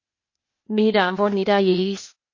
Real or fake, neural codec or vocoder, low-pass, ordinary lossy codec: fake; codec, 16 kHz, 0.8 kbps, ZipCodec; 7.2 kHz; MP3, 32 kbps